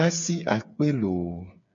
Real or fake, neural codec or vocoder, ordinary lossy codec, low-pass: fake; codec, 16 kHz, 8 kbps, FreqCodec, smaller model; MP3, 64 kbps; 7.2 kHz